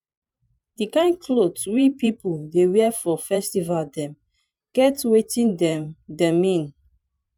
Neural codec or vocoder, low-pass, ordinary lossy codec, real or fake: vocoder, 44.1 kHz, 128 mel bands every 512 samples, BigVGAN v2; 19.8 kHz; none; fake